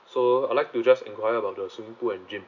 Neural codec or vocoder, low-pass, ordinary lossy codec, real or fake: none; 7.2 kHz; none; real